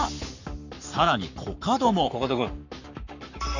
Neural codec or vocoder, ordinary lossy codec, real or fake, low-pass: codec, 44.1 kHz, 7.8 kbps, Pupu-Codec; none; fake; 7.2 kHz